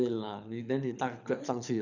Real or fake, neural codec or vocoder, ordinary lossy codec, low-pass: fake; codec, 24 kHz, 6 kbps, HILCodec; none; 7.2 kHz